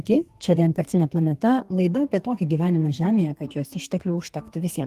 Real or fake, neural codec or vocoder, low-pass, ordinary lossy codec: fake; codec, 32 kHz, 1.9 kbps, SNAC; 14.4 kHz; Opus, 24 kbps